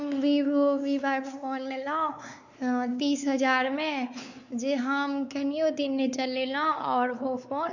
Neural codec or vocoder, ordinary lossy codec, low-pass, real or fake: codec, 16 kHz, 4 kbps, X-Codec, WavLM features, trained on Multilingual LibriSpeech; none; 7.2 kHz; fake